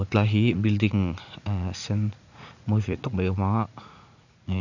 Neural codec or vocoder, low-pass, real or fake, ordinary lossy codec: vocoder, 44.1 kHz, 80 mel bands, Vocos; 7.2 kHz; fake; none